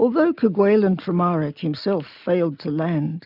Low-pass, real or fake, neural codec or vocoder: 5.4 kHz; real; none